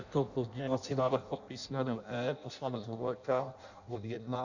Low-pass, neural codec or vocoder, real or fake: 7.2 kHz; codec, 16 kHz in and 24 kHz out, 0.6 kbps, FireRedTTS-2 codec; fake